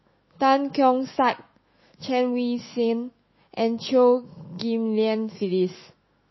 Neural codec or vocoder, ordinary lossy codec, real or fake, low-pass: autoencoder, 48 kHz, 128 numbers a frame, DAC-VAE, trained on Japanese speech; MP3, 24 kbps; fake; 7.2 kHz